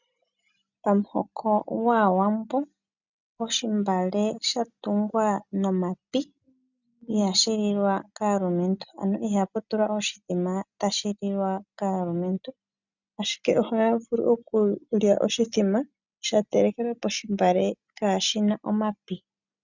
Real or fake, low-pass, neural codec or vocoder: real; 7.2 kHz; none